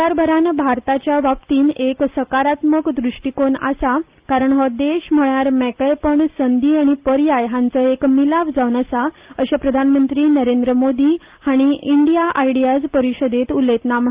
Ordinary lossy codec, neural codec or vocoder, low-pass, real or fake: Opus, 32 kbps; none; 3.6 kHz; real